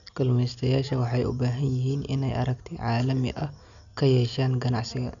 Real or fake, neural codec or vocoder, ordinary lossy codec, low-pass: real; none; none; 7.2 kHz